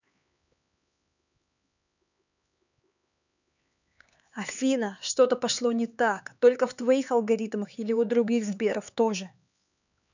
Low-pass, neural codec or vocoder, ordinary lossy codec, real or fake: 7.2 kHz; codec, 16 kHz, 4 kbps, X-Codec, HuBERT features, trained on LibriSpeech; none; fake